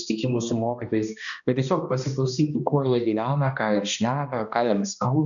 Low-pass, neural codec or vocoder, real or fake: 7.2 kHz; codec, 16 kHz, 1 kbps, X-Codec, HuBERT features, trained on balanced general audio; fake